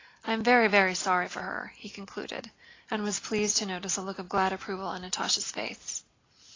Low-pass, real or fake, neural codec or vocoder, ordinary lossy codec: 7.2 kHz; real; none; AAC, 32 kbps